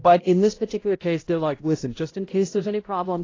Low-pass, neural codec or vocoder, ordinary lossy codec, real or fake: 7.2 kHz; codec, 16 kHz, 0.5 kbps, X-Codec, HuBERT features, trained on balanced general audio; AAC, 32 kbps; fake